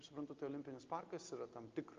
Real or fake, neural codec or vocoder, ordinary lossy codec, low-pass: real; none; Opus, 24 kbps; 7.2 kHz